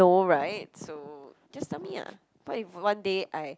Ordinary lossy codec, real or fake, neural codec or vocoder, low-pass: none; real; none; none